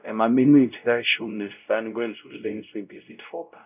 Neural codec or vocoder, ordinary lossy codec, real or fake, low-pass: codec, 16 kHz, 0.5 kbps, X-Codec, WavLM features, trained on Multilingual LibriSpeech; none; fake; 3.6 kHz